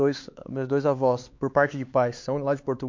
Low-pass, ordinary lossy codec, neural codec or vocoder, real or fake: 7.2 kHz; MP3, 48 kbps; codec, 16 kHz, 4 kbps, X-Codec, HuBERT features, trained on LibriSpeech; fake